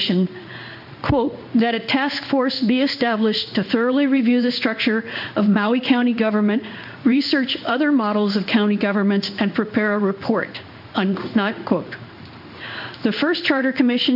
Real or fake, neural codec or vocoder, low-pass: fake; codec, 16 kHz in and 24 kHz out, 1 kbps, XY-Tokenizer; 5.4 kHz